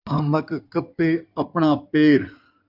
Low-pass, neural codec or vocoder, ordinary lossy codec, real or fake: 5.4 kHz; codec, 16 kHz in and 24 kHz out, 2.2 kbps, FireRedTTS-2 codec; AAC, 48 kbps; fake